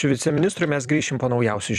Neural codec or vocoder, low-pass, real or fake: vocoder, 44.1 kHz, 128 mel bands every 256 samples, BigVGAN v2; 14.4 kHz; fake